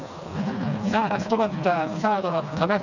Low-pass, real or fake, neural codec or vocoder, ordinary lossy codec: 7.2 kHz; fake; codec, 16 kHz, 2 kbps, FreqCodec, smaller model; none